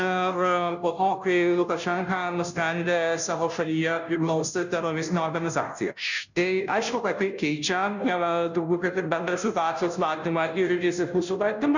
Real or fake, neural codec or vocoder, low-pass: fake; codec, 16 kHz, 0.5 kbps, FunCodec, trained on Chinese and English, 25 frames a second; 7.2 kHz